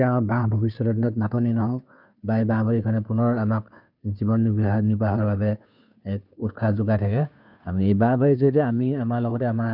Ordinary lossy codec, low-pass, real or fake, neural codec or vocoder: none; 5.4 kHz; fake; codec, 16 kHz, 2 kbps, FunCodec, trained on Chinese and English, 25 frames a second